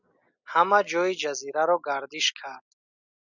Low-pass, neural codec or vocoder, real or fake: 7.2 kHz; none; real